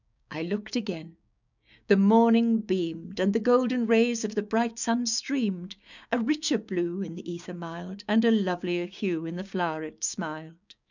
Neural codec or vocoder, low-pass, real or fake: codec, 16 kHz, 6 kbps, DAC; 7.2 kHz; fake